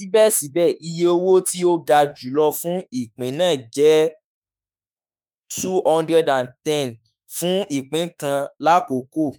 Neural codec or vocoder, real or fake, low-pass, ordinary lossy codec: autoencoder, 48 kHz, 32 numbers a frame, DAC-VAE, trained on Japanese speech; fake; none; none